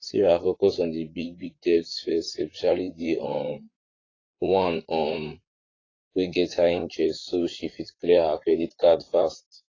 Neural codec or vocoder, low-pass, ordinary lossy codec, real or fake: vocoder, 44.1 kHz, 128 mel bands, Pupu-Vocoder; 7.2 kHz; AAC, 32 kbps; fake